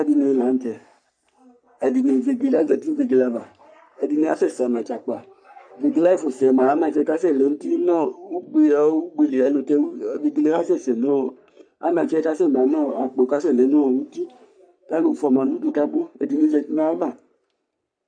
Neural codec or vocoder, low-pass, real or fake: codec, 44.1 kHz, 3.4 kbps, Pupu-Codec; 9.9 kHz; fake